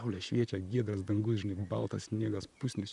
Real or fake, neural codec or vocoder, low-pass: fake; vocoder, 44.1 kHz, 128 mel bands, Pupu-Vocoder; 10.8 kHz